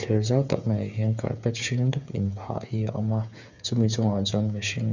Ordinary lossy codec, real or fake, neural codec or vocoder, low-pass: none; fake; codec, 16 kHz, 8 kbps, FreqCodec, smaller model; 7.2 kHz